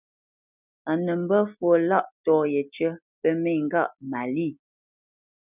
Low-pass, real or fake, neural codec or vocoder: 3.6 kHz; real; none